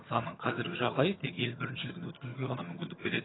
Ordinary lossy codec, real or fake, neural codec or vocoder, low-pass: AAC, 16 kbps; fake; vocoder, 22.05 kHz, 80 mel bands, HiFi-GAN; 7.2 kHz